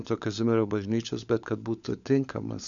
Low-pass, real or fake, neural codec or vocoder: 7.2 kHz; fake; codec, 16 kHz, 16 kbps, FunCodec, trained on LibriTTS, 50 frames a second